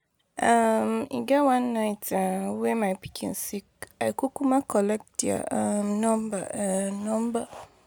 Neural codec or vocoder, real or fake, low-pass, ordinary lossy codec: none; real; none; none